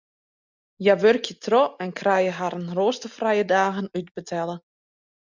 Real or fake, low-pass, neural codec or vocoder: real; 7.2 kHz; none